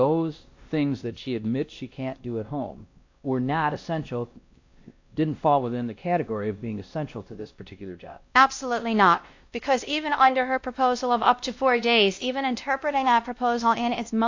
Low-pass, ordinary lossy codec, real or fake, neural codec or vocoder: 7.2 kHz; AAC, 48 kbps; fake; codec, 16 kHz, 1 kbps, X-Codec, WavLM features, trained on Multilingual LibriSpeech